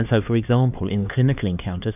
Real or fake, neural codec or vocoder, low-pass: fake; codec, 16 kHz, 2 kbps, X-Codec, HuBERT features, trained on LibriSpeech; 3.6 kHz